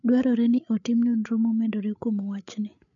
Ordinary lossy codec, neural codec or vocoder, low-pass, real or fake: none; codec, 16 kHz, 8 kbps, FreqCodec, larger model; 7.2 kHz; fake